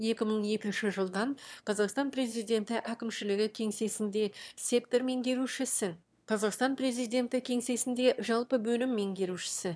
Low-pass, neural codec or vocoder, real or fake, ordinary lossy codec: none; autoencoder, 22.05 kHz, a latent of 192 numbers a frame, VITS, trained on one speaker; fake; none